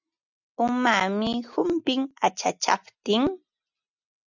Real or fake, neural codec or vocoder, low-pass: real; none; 7.2 kHz